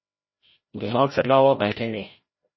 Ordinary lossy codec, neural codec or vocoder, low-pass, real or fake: MP3, 24 kbps; codec, 16 kHz, 0.5 kbps, FreqCodec, larger model; 7.2 kHz; fake